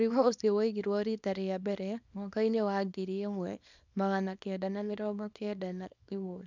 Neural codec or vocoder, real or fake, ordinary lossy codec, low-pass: codec, 24 kHz, 0.9 kbps, WavTokenizer, small release; fake; none; 7.2 kHz